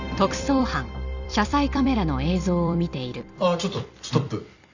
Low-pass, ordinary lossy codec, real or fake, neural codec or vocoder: 7.2 kHz; none; fake; vocoder, 44.1 kHz, 128 mel bands every 256 samples, BigVGAN v2